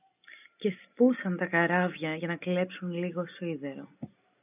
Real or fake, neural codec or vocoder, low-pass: real; none; 3.6 kHz